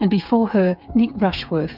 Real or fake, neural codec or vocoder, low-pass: fake; codec, 16 kHz, 8 kbps, FreqCodec, smaller model; 5.4 kHz